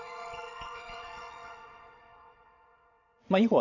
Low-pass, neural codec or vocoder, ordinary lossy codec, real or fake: 7.2 kHz; vocoder, 22.05 kHz, 80 mel bands, WaveNeXt; none; fake